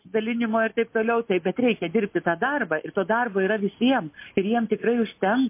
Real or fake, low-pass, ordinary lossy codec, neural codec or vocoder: real; 3.6 kHz; MP3, 24 kbps; none